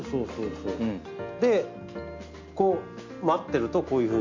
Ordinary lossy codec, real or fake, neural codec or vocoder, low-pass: MP3, 48 kbps; real; none; 7.2 kHz